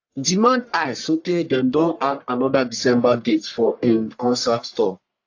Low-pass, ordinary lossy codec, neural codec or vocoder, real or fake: 7.2 kHz; AAC, 48 kbps; codec, 44.1 kHz, 1.7 kbps, Pupu-Codec; fake